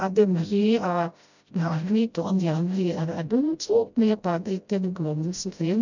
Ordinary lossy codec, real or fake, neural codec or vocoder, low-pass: none; fake; codec, 16 kHz, 0.5 kbps, FreqCodec, smaller model; 7.2 kHz